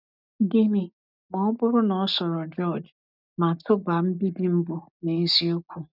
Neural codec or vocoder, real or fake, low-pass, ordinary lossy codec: none; real; 5.4 kHz; none